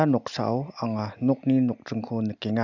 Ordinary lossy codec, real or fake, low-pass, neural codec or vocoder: none; real; 7.2 kHz; none